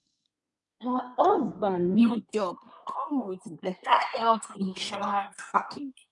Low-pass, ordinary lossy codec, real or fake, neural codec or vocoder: 10.8 kHz; none; fake; codec, 24 kHz, 1 kbps, SNAC